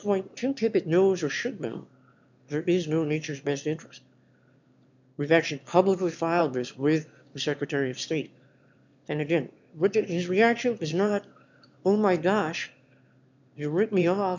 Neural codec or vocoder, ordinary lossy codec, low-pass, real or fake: autoencoder, 22.05 kHz, a latent of 192 numbers a frame, VITS, trained on one speaker; MP3, 64 kbps; 7.2 kHz; fake